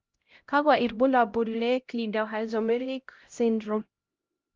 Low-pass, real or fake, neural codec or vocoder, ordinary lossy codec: 7.2 kHz; fake; codec, 16 kHz, 0.5 kbps, X-Codec, HuBERT features, trained on LibriSpeech; Opus, 24 kbps